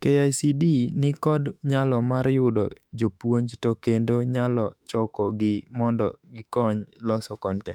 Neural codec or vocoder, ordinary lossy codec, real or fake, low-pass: autoencoder, 48 kHz, 32 numbers a frame, DAC-VAE, trained on Japanese speech; none; fake; 19.8 kHz